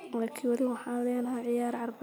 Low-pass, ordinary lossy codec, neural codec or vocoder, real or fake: none; none; none; real